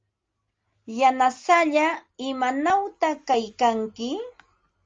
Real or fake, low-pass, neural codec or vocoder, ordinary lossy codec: real; 7.2 kHz; none; Opus, 32 kbps